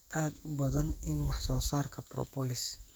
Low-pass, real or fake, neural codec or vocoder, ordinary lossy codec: none; fake; codec, 44.1 kHz, 2.6 kbps, SNAC; none